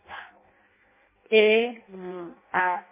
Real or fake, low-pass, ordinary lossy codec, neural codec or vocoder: fake; 3.6 kHz; MP3, 16 kbps; codec, 16 kHz in and 24 kHz out, 0.6 kbps, FireRedTTS-2 codec